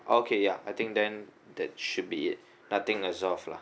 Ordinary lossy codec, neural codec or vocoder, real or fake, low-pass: none; none; real; none